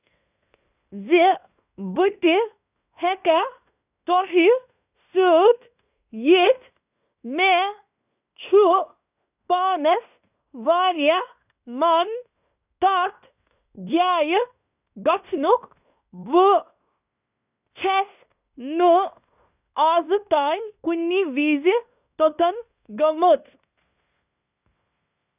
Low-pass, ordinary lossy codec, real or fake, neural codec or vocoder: 3.6 kHz; none; fake; codec, 16 kHz in and 24 kHz out, 0.9 kbps, LongCat-Audio-Codec, fine tuned four codebook decoder